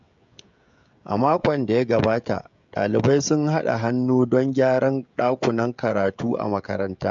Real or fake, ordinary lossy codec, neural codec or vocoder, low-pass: fake; MP3, 64 kbps; codec, 16 kHz, 16 kbps, FreqCodec, smaller model; 7.2 kHz